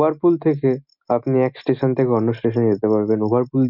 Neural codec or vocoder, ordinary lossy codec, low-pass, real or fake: none; none; 5.4 kHz; real